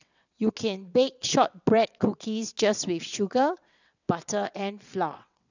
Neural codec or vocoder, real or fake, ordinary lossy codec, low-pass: vocoder, 22.05 kHz, 80 mel bands, WaveNeXt; fake; none; 7.2 kHz